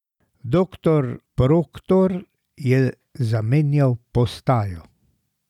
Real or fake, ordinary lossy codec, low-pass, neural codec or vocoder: real; none; 19.8 kHz; none